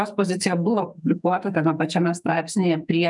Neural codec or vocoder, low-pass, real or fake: codec, 44.1 kHz, 2.6 kbps, SNAC; 10.8 kHz; fake